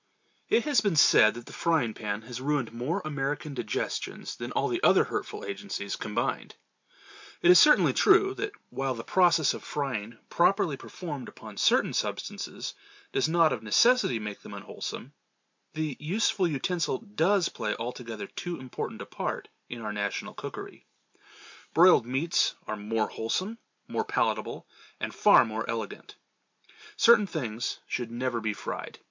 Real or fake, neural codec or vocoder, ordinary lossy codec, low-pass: real; none; MP3, 64 kbps; 7.2 kHz